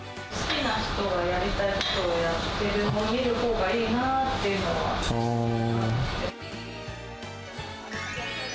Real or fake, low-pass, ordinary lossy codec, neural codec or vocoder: real; none; none; none